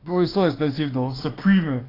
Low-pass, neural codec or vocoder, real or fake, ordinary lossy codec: 5.4 kHz; autoencoder, 48 kHz, 32 numbers a frame, DAC-VAE, trained on Japanese speech; fake; AAC, 24 kbps